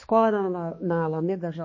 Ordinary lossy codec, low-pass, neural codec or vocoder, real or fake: MP3, 32 kbps; 7.2 kHz; codec, 16 kHz, 2 kbps, X-Codec, HuBERT features, trained on balanced general audio; fake